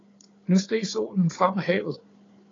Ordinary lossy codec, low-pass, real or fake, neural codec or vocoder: AAC, 32 kbps; 7.2 kHz; fake; codec, 16 kHz, 4 kbps, FunCodec, trained on Chinese and English, 50 frames a second